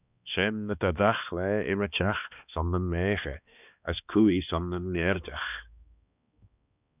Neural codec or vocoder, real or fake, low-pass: codec, 16 kHz, 2 kbps, X-Codec, HuBERT features, trained on balanced general audio; fake; 3.6 kHz